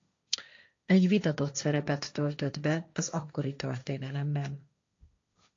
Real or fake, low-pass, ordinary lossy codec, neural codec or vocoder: fake; 7.2 kHz; AAC, 48 kbps; codec, 16 kHz, 1.1 kbps, Voila-Tokenizer